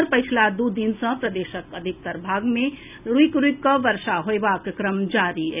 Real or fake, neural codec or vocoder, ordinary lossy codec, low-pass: real; none; none; 3.6 kHz